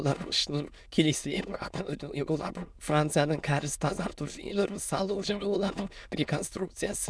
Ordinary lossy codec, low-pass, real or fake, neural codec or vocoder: none; none; fake; autoencoder, 22.05 kHz, a latent of 192 numbers a frame, VITS, trained on many speakers